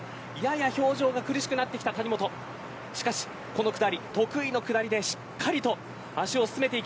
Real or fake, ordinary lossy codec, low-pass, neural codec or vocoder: real; none; none; none